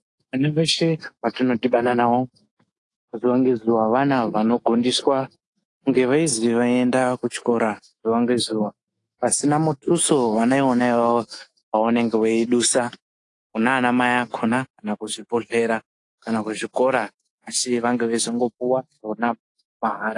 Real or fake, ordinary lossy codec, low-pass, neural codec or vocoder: fake; AAC, 48 kbps; 10.8 kHz; autoencoder, 48 kHz, 128 numbers a frame, DAC-VAE, trained on Japanese speech